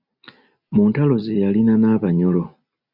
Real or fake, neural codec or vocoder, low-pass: real; none; 5.4 kHz